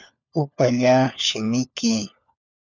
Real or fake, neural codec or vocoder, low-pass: fake; codec, 16 kHz, 4 kbps, FunCodec, trained on LibriTTS, 50 frames a second; 7.2 kHz